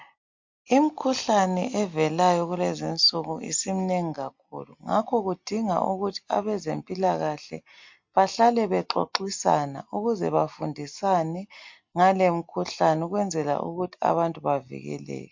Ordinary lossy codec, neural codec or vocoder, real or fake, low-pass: MP3, 48 kbps; none; real; 7.2 kHz